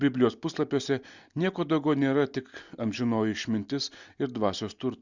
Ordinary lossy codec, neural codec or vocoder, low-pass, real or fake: Opus, 64 kbps; none; 7.2 kHz; real